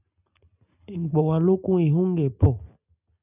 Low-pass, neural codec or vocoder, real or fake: 3.6 kHz; none; real